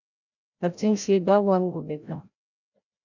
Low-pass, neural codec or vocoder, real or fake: 7.2 kHz; codec, 16 kHz, 0.5 kbps, FreqCodec, larger model; fake